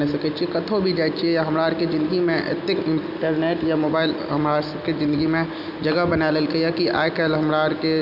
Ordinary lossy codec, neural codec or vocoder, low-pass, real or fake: none; none; 5.4 kHz; real